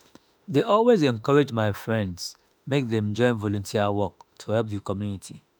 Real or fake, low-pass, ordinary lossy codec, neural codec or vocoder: fake; none; none; autoencoder, 48 kHz, 32 numbers a frame, DAC-VAE, trained on Japanese speech